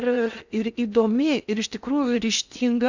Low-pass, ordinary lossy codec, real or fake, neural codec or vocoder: 7.2 kHz; Opus, 64 kbps; fake; codec, 16 kHz in and 24 kHz out, 0.6 kbps, FocalCodec, streaming, 2048 codes